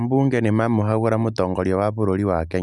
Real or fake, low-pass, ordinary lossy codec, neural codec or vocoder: real; none; none; none